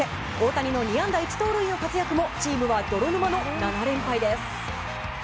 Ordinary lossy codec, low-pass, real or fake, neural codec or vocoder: none; none; real; none